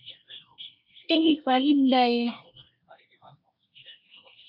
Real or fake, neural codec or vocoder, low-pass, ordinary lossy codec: fake; codec, 24 kHz, 1 kbps, SNAC; 5.4 kHz; AAC, 48 kbps